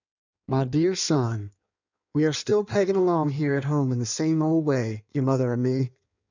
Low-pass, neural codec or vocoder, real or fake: 7.2 kHz; codec, 16 kHz in and 24 kHz out, 1.1 kbps, FireRedTTS-2 codec; fake